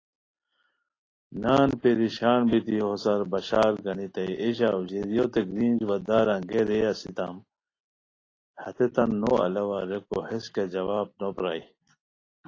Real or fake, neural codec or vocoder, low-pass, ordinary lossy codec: real; none; 7.2 kHz; AAC, 32 kbps